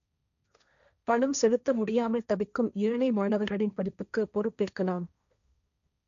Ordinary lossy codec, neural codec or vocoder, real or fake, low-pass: none; codec, 16 kHz, 1.1 kbps, Voila-Tokenizer; fake; 7.2 kHz